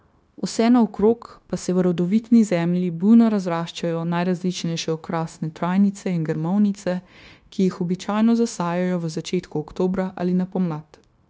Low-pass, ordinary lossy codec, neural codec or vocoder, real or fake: none; none; codec, 16 kHz, 0.9 kbps, LongCat-Audio-Codec; fake